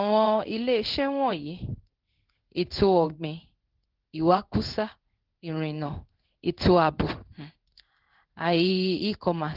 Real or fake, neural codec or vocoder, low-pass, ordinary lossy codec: fake; codec, 16 kHz in and 24 kHz out, 1 kbps, XY-Tokenizer; 5.4 kHz; Opus, 16 kbps